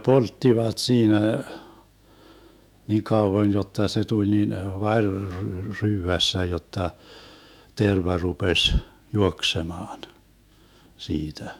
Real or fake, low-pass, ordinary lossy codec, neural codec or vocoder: fake; 19.8 kHz; none; autoencoder, 48 kHz, 128 numbers a frame, DAC-VAE, trained on Japanese speech